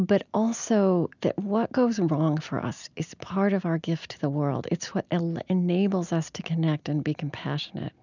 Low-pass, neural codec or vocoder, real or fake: 7.2 kHz; none; real